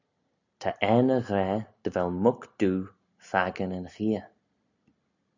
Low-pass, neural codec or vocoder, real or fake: 7.2 kHz; none; real